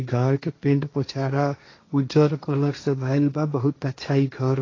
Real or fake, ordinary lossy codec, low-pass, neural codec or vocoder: fake; AAC, 32 kbps; 7.2 kHz; codec, 16 kHz, 1.1 kbps, Voila-Tokenizer